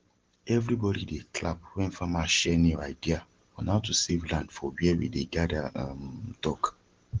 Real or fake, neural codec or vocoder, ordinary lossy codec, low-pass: real; none; Opus, 16 kbps; 7.2 kHz